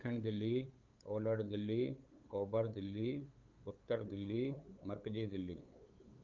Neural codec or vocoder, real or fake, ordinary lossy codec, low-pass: codec, 16 kHz, 8 kbps, FunCodec, trained on LibriTTS, 25 frames a second; fake; Opus, 24 kbps; 7.2 kHz